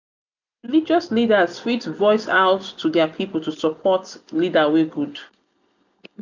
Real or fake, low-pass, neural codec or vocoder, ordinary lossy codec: real; 7.2 kHz; none; none